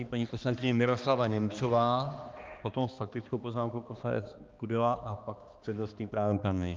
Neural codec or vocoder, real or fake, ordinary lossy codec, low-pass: codec, 16 kHz, 2 kbps, X-Codec, HuBERT features, trained on balanced general audio; fake; Opus, 24 kbps; 7.2 kHz